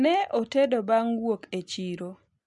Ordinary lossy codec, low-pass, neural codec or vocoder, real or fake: MP3, 96 kbps; 10.8 kHz; none; real